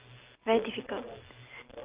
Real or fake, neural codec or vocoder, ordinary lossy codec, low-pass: real; none; Opus, 24 kbps; 3.6 kHz